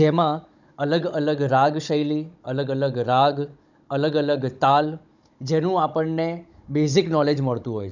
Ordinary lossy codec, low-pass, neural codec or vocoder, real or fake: none; 7.2 kHz; codec, 16 kHz, 16 kbps, FunCodec, trained on Chinese and English, 50 frames a second; fake